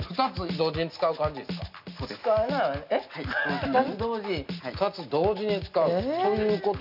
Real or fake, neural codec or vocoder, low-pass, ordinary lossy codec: real; none; 5.4 kHz; none